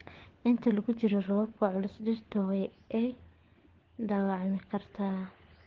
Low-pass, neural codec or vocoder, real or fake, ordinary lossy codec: 7.2 kHz; codec, 16 kHz, 4 kbps, FunCodec, trained on LibriTTS, 50 frames a second; fake; Opus, 16 kbps